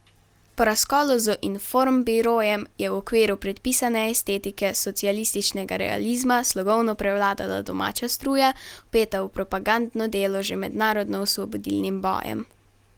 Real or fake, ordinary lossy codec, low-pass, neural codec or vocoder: real; Opus, 24 kbps; 19.8 kHz; none